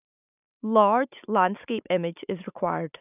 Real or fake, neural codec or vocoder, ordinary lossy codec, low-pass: real; none; none; 3.6 kHz